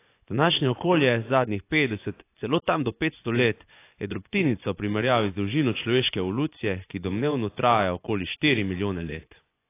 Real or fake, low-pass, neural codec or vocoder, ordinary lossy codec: fake; 3.6 kHz; vocoder, 24 kHz, 100 mel bands, Vocos; AAC, 24 kbps